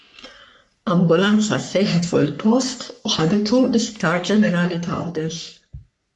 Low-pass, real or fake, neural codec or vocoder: 10.8 kHz; fake; codec, 44.1 kHz, 3.4 kbps, Pupu-Codec